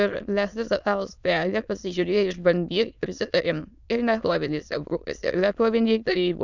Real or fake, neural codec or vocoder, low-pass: fake; autoencoder, 22.05 kHz, a latent of 192 numbers a frame, VITS, trained on many speakers; 7.2 kHz